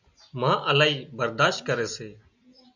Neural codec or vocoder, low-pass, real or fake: none; 7.2 kHz; real